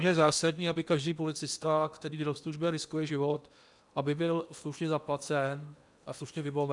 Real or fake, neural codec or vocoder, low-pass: fake; codec, 16 kHz in and 24 kHz out, 0.8 kbps, FocalCodec, streaming, 65536 codes; 10.8 kHz